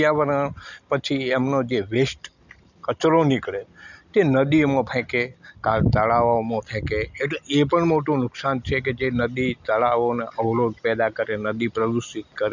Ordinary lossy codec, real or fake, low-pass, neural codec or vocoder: none; real; 7.2 kHz; none